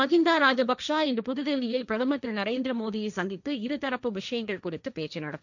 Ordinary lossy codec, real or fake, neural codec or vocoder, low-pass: none; fake; codec, 16 kHz, 1.1 kbps, Voila-Tokenizer; 7.2 kHz